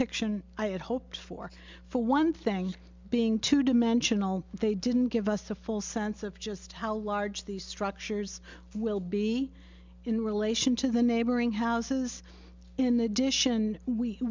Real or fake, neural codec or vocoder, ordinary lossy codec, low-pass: real; none; MP3, 64 kbps; 7.2 kHz